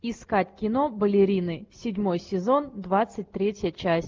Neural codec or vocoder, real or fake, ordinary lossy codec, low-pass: none; real; Opus, 24 kbps; 7.2 kHz